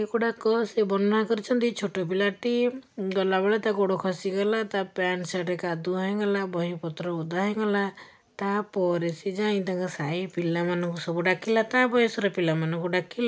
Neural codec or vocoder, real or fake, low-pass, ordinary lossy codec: none; real; none; none